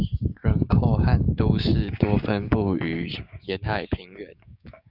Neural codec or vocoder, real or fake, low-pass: codec, 16 kHz, 6 kbps, DAC; fake; 5.4 kHz